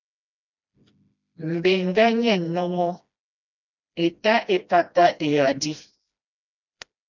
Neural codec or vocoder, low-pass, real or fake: codec, 16 kHz, 1 kbps, FreqCodec, smaller model; 7.2 kHz; fake